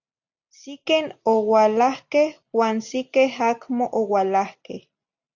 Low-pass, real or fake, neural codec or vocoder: 7.2 kHz; real; none